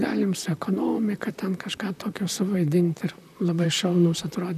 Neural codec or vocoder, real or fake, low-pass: vocoder, 44.1 kHz, 128 mel bands, Pupu-Vocoder; fake; 14.4 kHz